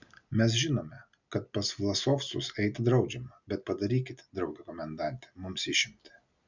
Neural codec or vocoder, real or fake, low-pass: none; real; 7.2 kHz